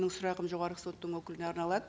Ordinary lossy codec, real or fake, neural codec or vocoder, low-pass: none; real; none; none